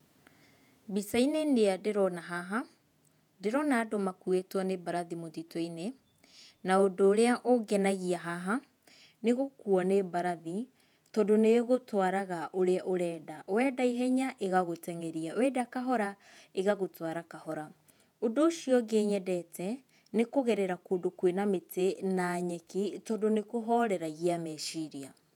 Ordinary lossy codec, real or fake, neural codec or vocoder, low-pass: none; fake; vocoder, 48 kHz, 128 mel bands, Vocos; 19.8 kHz